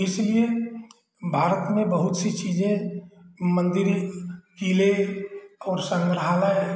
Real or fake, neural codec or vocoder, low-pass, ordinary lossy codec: real; none; none; none